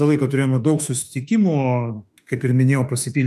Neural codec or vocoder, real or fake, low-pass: autoencoder, 48 kHz, 32 numbers a frame, DAC-VAE, trained on Japanese speech; fake; 14.4 kHz